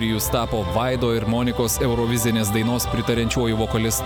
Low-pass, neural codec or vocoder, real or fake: 19.8 kHz; none; real